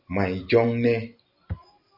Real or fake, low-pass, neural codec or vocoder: real; 5.4 kHz; none